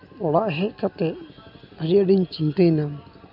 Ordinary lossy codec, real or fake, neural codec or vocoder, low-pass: AAC, 48 kbps; real; none; 5.4 kHz